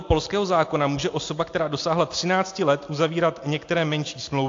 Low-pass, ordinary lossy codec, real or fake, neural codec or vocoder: 7.2 kHz; AAC, 48 kbps; real; none